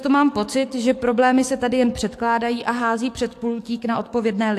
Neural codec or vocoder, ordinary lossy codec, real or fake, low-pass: codec, 44.1 kHz, 7.8 kbps, DAC; AAC, 64 kbps; fake; 14.4 kHz